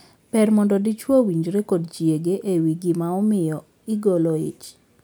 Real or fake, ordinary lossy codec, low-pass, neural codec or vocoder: real; none; none; none